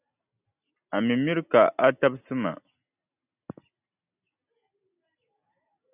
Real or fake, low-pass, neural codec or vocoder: real; 3.6 kHz; none